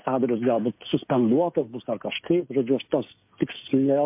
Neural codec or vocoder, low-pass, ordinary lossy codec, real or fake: codec, 16 kHz, 16 kbps, FreqCodec, smaller model; 3.6 kHz; MP3, 32 kbps; fake